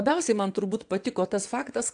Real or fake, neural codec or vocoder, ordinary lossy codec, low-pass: fake; vocoder, 22.05 kHz, 80 mel bands, WaveNeXt; AAC, 64 kbps; 9.9 kHz